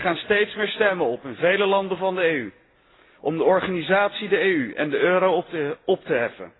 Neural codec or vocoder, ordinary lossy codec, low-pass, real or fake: none; AAC, 16 kbps; 7.2 kHz; real